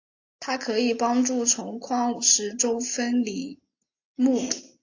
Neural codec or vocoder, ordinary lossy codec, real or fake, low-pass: none; AAC, 48 kbps; real; 7.2 kHz